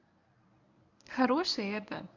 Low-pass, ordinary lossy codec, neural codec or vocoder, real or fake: 7.2 kHz; none; codec, 24 kHz, 0.9 kbps, WavTokenizer, medium speech release version 1; fake